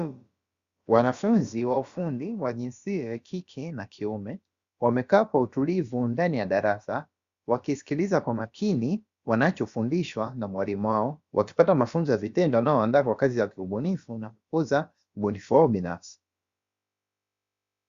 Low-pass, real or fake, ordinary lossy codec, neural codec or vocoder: 7.2 kHz; fake; Opus, 64 kbps; codec, 16 kHz, about 1 kbps, DyCAST, with the encoder's durations